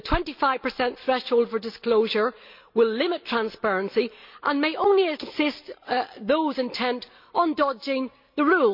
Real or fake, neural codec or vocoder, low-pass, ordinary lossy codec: real; none; 5.4 kHz; MP3, 48 kbps